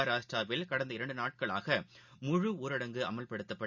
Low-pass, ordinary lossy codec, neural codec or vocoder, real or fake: 7.2 kHz; none; none; real